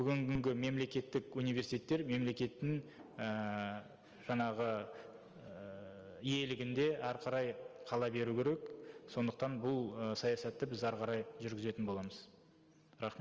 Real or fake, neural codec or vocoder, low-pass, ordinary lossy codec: real; none; 7.2 kHz; Opus, 24 kbps